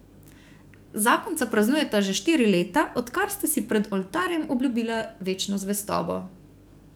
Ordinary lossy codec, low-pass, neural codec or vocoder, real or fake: none; none; codec, 44.1 kHz, 7.8 kbps, DAC; fake